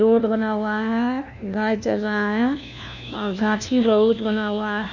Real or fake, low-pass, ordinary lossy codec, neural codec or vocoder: fake; 7.2 kHz; none; codec, 16 kHz, 1 kbps, FunCodec, trained on LibriTTS, 50 frames a second